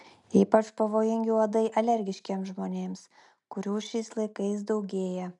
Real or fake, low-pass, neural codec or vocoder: real; 10.8 kHz; none